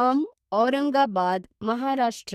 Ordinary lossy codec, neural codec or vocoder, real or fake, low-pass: none; codec, 32 kHz, 1.9 kbps, SNAC; fake; 14.4 kHz